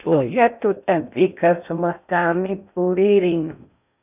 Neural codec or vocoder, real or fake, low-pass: codec, 16 kHz in and 24 kHz out, 0.6 kbps, FocalCodec, streaming, 2048 codes; fake; 3.6 kHz